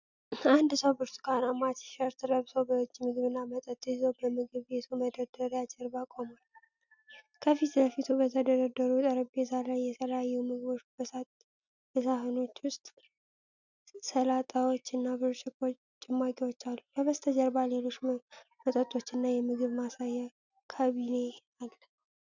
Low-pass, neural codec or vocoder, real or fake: 7.2 kHz; none; real